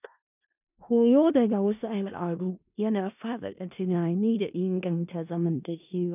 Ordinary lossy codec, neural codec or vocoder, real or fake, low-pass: none; codec, 16 kHz in and 24 kHz out, 0.4 kbps, LongCat-Audio-Codec, four codebook decoder; fake; 3.6 kHz